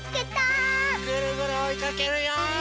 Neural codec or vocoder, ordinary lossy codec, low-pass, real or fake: none; none; none; real